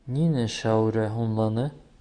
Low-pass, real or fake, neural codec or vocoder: 9.9 kHz; real; none